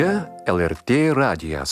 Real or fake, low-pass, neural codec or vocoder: real; 14.4 kHz; none